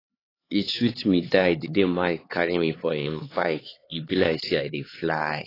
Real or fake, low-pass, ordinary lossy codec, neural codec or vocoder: fake; 5.4 kHz; AAC, 24 kbps; codec, 16 kHz, 4 kbps, X-Codec, HuBERT features, trained on LibriSpeech